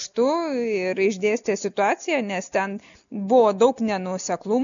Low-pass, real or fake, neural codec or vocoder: 7.2 kHz; real; none